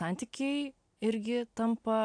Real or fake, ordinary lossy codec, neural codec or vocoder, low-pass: real; AAC, 64 kbps; none; 9.9 kHz